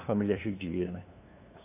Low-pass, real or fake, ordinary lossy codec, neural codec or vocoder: 3.6 kHz; fake; AAC, 24 kbps; codec, 16 kHz, 2 kbps, FunCodec, trained on LibriTTS, 25 frames a second